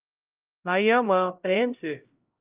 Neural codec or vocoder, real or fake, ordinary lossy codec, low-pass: codec, 16 kHz, 0.5 kbps, X-Codec, HuBERT features, trained on LibriSpeech; fake; Opus, 24 kbps; 3.6 kHz